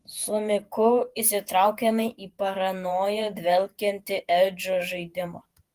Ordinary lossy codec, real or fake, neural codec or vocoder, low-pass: Opus, 24 kbps; fake; vocoder, 48 kHz, 128 mel bands, Vocos; 14.4 kHz